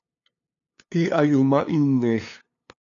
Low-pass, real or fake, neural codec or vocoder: 7.2 kHz; fake; codec, 16 kHz, 2 kbps, FunCodec, trained on LibriTTS, 25 frames a second